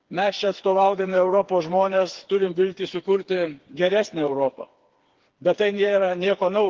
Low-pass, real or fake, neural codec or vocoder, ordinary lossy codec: 7.2 kHz; fake; codec, 16 kHz, 4 kbps, FreqCodec, smaller model; Opus, 16 kbps